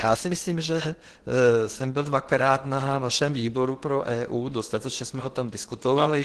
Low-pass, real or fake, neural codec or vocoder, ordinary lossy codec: 10.8 kHz; fake; codec, 16 kHz in and 24 kHz out, 0.8 kbps, FocalCodec, streaming, 65536 codes; Opus, 16 kbps